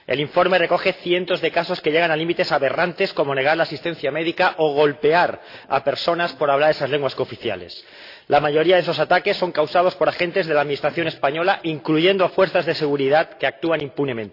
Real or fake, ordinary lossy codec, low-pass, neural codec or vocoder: real; AAC, 32 kbps; 5.4 kHz; none